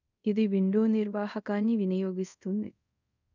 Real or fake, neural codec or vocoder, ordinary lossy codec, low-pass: fake; codec, 24 kHz, 0.5 kbps, DualCodec; none; 7.2 kHz